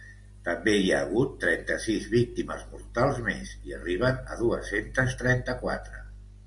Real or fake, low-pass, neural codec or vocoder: real; 10.8 kHz; none